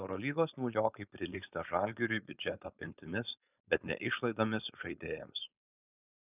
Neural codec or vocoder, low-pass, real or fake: codec, 16 kHz, 4.8 kbps, FACodec; 3.6 kHz; fake